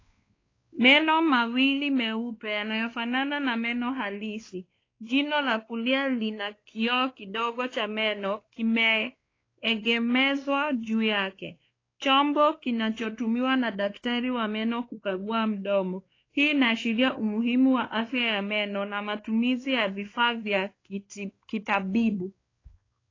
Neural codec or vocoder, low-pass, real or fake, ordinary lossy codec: codec, 16 kHz, 2 kbps, X-Codec, WavLM features, trained on Multilingual LibriSpeech; 7.2 kHz; fake; AAC, 32 kbps